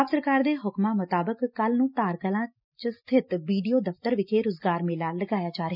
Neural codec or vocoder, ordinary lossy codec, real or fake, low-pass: none; none; real; 5.4 kHz